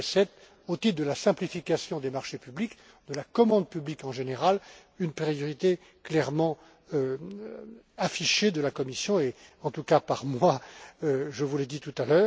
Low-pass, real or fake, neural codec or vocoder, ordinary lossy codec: none; real; none; none